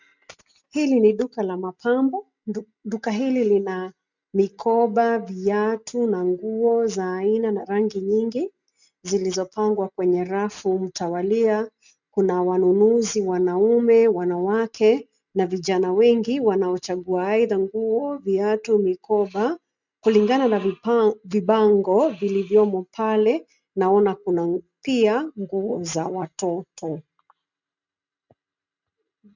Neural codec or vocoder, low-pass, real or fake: none; 7.2 kHz; real